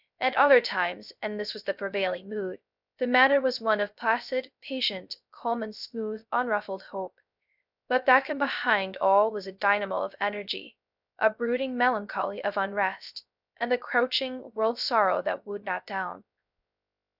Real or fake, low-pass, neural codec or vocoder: fake; 5.4 kHz; codec, 16 kHz, 0.3 kbps, FocalCodec